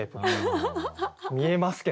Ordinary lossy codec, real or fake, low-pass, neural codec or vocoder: none; real; none; none